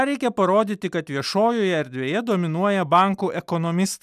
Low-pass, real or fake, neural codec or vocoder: 14.4 kHz; real; none